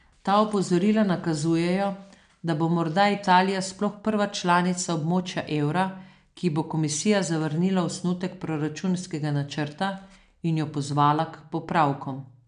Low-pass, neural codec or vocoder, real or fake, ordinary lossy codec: 9.9 kHz; none; real; none